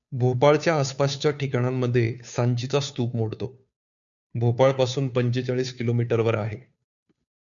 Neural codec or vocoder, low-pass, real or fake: codec, 16 kHz, 2 kbps, FunCodec, trained on Chinese and English, 25 frames a second; 7.2 kHz; fake